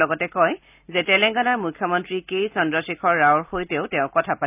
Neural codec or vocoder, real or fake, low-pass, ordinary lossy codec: none; real; 3.6 kHz; none